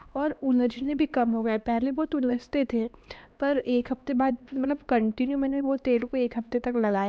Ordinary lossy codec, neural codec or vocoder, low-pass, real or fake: none; codec, 16 kHz, 2 kbps, X-Codec, HuBERT features, trained on LibriSpeech; none; fake